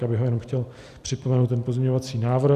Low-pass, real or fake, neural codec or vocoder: 14.4 kHz; real; none